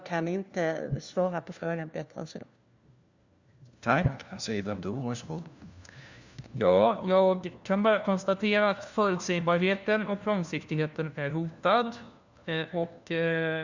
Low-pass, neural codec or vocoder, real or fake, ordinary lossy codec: 7.2 kHz; codec, 16 kHz, 1 kbps, FunCodec, trained on LibriTTS, 50 frames a second; fake; Opus, 64 kbps